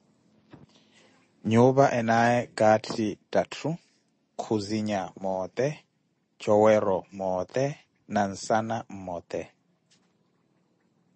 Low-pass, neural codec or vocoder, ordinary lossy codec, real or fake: 9.9 kHz; none; MP3, 32 kbps; real